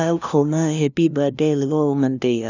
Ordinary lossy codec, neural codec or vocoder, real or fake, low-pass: none; codec, 16 kHz, 0.5 kbps, FunCodec, trained on LibriTTS, 25 frames a second; fake; 7.2 kHz